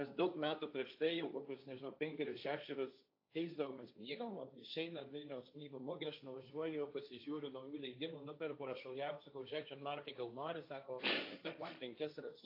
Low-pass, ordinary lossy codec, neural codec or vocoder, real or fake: 5.4 kHz; AAC, 32 kbps; codec, 16 kHz, 1.1 kbps, Voila-Tokenizer; fake